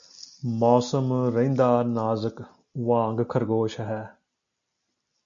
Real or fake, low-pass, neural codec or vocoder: real; 7.2 kHz; none